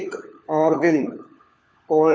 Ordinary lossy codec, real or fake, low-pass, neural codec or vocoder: none; fake; none; codec, 16 kHz, 4 kbps, FunCodec, trained on LibriTTS, 50 frames a second